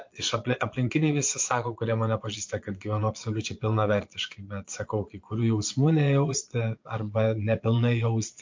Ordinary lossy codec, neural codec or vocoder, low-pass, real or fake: MP3, 48 kbps; vocoder, 22.05 kHz, 80 mel bands, Vocos; 7.2 kHz; fake